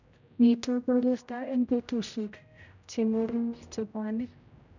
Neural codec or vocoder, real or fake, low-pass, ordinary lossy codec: codec, 16 kHz, 0.5 kbps, X-Codec, HuBERT features, trained on general audio; fake; 7.2 kHz; none